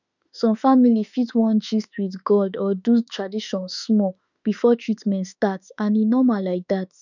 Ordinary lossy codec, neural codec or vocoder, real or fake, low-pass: none; autoencoder, 48 kHz, 32 numbers a frame, DAC-VAE, trained on Japanese speech; fake; 7.2 kHz